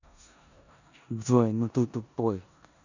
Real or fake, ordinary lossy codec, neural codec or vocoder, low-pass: fake; none; codec, 16 kHz in and 24 kHz out, 0.9 kbps, LongCat-Audio-Codec, four codebook decoder; 7.2 kHz